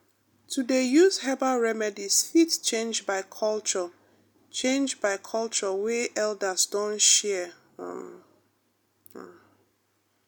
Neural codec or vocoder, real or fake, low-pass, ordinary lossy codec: none; real; none; none